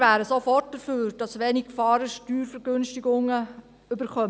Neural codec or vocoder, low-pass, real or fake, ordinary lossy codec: none; none; real; none